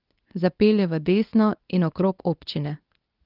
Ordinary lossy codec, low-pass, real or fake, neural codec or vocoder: Opus, 24 kbps; 5.4 kHz; fake; codec, 24 kHz, 0.9 kbps, WavTokenizer, medium speech release version 2